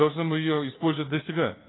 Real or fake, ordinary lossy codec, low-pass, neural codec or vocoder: fake; AAC, 16 kbps; 7.2 kHz; codec, 16 kHz, 4 kbps, FunCodec, trained on LibriTTS, 50 frames a second